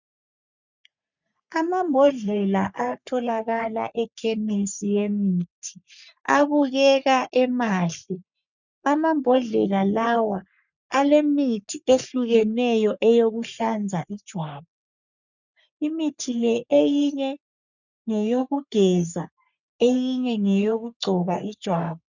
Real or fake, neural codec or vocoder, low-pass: fake; codec, 44.1 kHz, 3.4 kbps, Pupu-Codec; 7.2 kHz